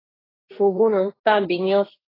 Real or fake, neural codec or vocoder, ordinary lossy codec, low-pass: fake; codec, 16 kHz, 1.1 kbps, Voila-Tokenizer; AAC, 24 kbps; 5.4 kHz